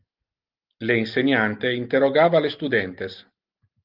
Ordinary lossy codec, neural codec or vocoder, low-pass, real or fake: Opus, 32 kbps; none; 5.4 kHz; real